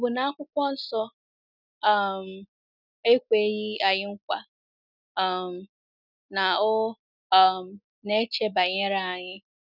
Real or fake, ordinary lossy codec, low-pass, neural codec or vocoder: real; none; 5.4 kHz; none